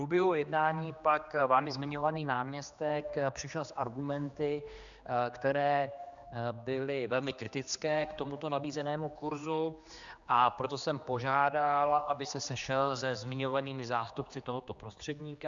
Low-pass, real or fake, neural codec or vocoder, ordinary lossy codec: 7.2 kHz; fake; codec, 16 kHz, 2 kbps, X-Codec, HuBERT features, trained on general audio; Opus, 64 kbps